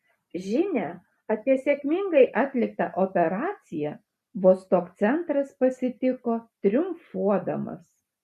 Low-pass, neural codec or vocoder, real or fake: 14.4 kHz; none; real